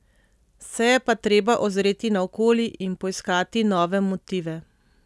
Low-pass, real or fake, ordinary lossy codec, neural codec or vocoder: none; real; none; none